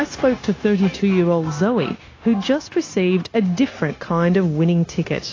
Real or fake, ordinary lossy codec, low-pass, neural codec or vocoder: fake; AAC, 32 kbps; 7.2 kHz; codec, 16 kHz, 0.9 kbps, LongCat-Audio-Codec